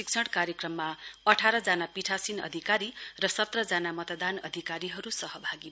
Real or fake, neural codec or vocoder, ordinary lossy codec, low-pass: real; none; none; none